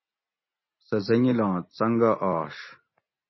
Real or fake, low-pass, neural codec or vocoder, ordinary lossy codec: real; 7.2 kHz; none; MP3, 24 kbps